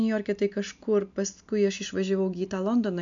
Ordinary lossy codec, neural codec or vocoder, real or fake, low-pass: MP3, 64 kbps; none; real; 7.2 kHz